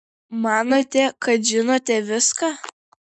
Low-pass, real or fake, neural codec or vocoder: 9.9 kHz; real; none